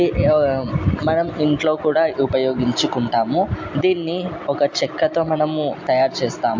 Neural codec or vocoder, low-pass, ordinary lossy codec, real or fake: none; 7.2 kHz; MP3, 48 kbps; real